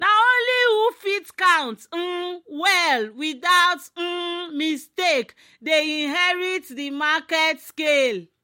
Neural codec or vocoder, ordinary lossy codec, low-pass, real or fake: none; MP3, 64 kbps; 19.8 kHz; real